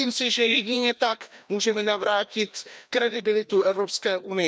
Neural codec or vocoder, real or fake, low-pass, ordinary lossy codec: codec, 16 kHz, 1 kbps, FreqCodec, larger model; fake; none; none